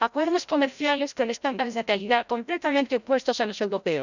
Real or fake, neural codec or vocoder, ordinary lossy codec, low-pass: fake; codec, 16 kHz, 0.5 kbps, FreqCodec, larger model; none; 7.2 kHz